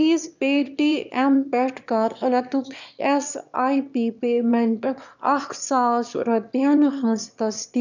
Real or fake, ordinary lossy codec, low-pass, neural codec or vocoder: fake; none; 7.2 kHz; autoencoder, 22.05 kHz, a latent of 192 numbers a frame, VITS, trained on one speaker